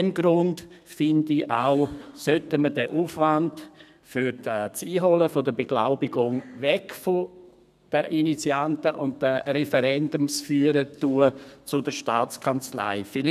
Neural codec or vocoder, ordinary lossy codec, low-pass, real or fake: codec, 32 kHz, 1.9 kbps, SNAC; none; 14.4 kHz; fake